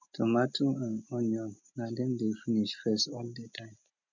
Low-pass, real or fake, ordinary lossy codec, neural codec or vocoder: 7.2 kHz; real; MP3, 64 kbps; none